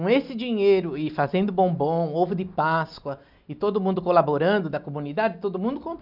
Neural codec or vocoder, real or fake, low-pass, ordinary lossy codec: none; real; 5.4 kHz; none